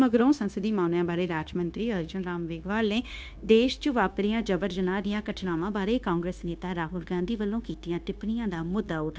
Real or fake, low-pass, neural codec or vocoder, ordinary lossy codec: fake; none; codec, 16 kHz, 0.9 kbps, LongCat-Audio-Codec; none